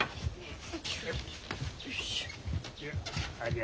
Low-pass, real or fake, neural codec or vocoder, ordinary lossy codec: none; real; none; none